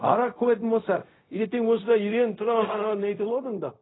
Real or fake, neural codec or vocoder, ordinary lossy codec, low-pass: fake; codec, 16 kHz, 0.4 kbps, LongCat-Audio-Codec; AAC, 16 kbps; 7.2 kHz